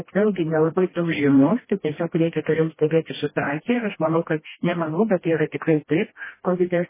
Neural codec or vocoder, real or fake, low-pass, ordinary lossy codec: codec, 16 kHz, 1 kbps, FreqCodec, smaller model; fake; 3.6 kHz; MP3, 16 kbps